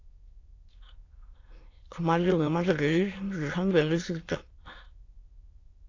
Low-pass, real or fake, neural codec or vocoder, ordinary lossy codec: 7.2 kHz; fake; autoencoder, 22.05 kHz, a latent of 192 numbers a frame, VITS, trained on many speakers; AAC, 32 kbps